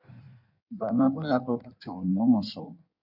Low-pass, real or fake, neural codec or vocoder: 5.4 kHz; fake; codec, 16 kHz in and 24 kHz out, 1.1 kbps, FireRedTTS-2 codec